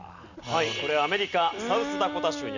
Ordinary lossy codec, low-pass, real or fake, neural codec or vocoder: none; 7.2 kHz; real; none